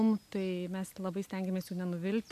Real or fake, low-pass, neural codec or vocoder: real; 14.4 kHz; none